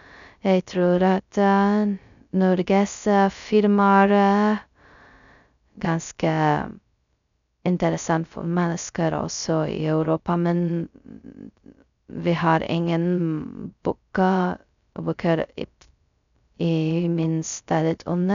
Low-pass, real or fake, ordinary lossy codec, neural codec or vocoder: 7.2 kHz; fake; none; codec, 16 kHz, 0.2 kbps, FocalCodec